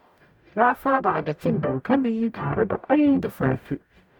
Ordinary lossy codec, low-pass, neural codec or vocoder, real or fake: none; 19.8 kHz; codec, 44.1 kHz, 0.9 kbps, DAC; fake